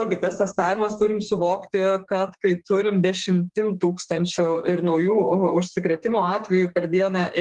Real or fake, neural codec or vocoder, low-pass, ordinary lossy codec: fake; codec, 32 kHz, 1.9 kbps, SNAC; 10.8 kHz; Opus, 24 kbps